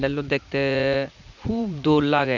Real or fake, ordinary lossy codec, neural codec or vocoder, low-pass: fake; Opus, 64 kbps; vocoder, 44.1 kHz, 80 mel bands, Vocos; 7.2 kHz